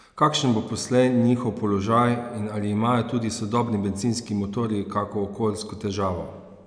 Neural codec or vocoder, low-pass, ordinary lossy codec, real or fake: none; 9.9 kHz; none; real